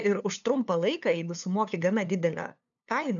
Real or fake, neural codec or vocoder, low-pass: fake; codec, 16 kHz, 2 kbps, FunCodec, trained on LibriTTS, 25 frames a second; 7.2 kHz